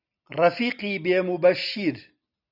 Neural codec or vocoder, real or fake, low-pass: none; real; 5.4 kHz